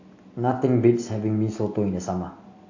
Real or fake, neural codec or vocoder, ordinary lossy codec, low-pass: real; none; AAC, 48 kbps; 7.2 kHz